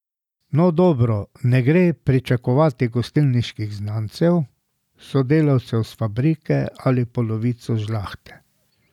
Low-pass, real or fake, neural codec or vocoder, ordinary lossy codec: 19.8 kHz; real; none; none